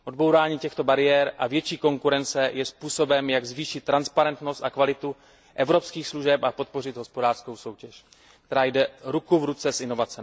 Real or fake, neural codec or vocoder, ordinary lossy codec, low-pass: real; none; none; none